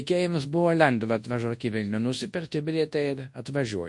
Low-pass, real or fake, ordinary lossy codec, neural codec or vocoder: 10.8 kHz; fake; MP3, 48 kbps; codec, 24 kHz, 0.9 kbps, WavTokenizer, large speech release